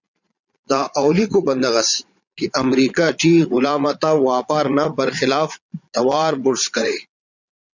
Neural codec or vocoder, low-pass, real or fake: vocoder, 22.05 kHz, 80 mel bands, Vocos; 7.2 kHz; fake